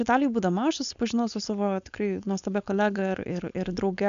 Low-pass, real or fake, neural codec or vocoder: 7.2 kHz; fake; codec, 16 kHz, 4.8 kbps, FACodec